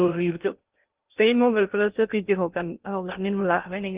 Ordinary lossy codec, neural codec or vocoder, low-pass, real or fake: Opus, 32 kbps; codec, 16 kHz in and 24 kHz out, 0.6 kbps, FocalCodec, streaming, 2048 codes; 3.6 kHz; fake